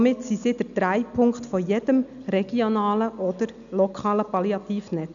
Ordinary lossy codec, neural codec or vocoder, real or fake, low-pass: none; none; real; 7.2 kHz